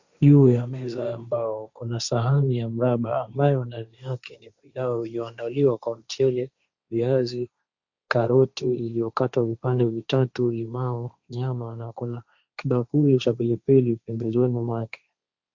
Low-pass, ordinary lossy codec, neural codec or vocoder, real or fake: 7.2 kHz; Opus, 64 kbps; codec, 16 kHz, 1.1 kbps, Voila-Tokenizer; fake